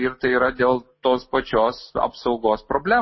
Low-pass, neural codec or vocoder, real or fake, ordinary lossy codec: 7.2 kHz; none; real; MP3, 24 kbps